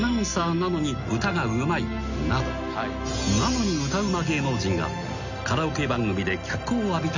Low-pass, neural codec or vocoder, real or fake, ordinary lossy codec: 7.2 kHz; none; real; none